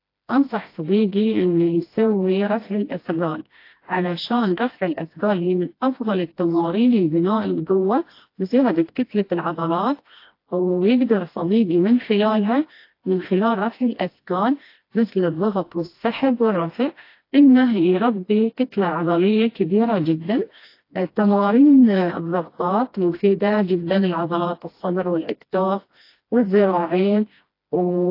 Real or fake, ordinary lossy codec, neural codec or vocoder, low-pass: fake; AAC, 32 kbps; codec, 16 kHz, 1 kbps, FreqCodec, smaller model; 5.4 kHz